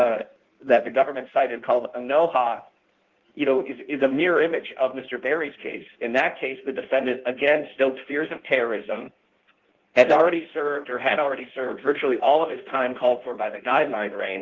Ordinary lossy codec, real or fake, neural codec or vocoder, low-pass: Opus, 16 kbps; fake; codec, 16 kHz in and 24 kHz out, 1.1 kbps, FireRedTTS-2 codec; 7.2 kHz